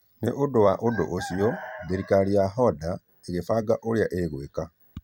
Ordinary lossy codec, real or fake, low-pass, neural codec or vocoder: none; fake; 19.8 kHz; vocoder, 44.1 kHz, 128 mel bands every 256 samples, BigVGAN v2